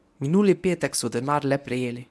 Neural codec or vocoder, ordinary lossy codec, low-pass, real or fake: codec, 24 kHz, 0.9 kbps, WavTokenizer, medium speech release version 1; none; none; fake